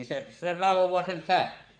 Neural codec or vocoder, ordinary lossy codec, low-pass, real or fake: codec, 44.1 kHz, 3.4 kbps, Pupu-Codec; none; 9.9 kHz; fake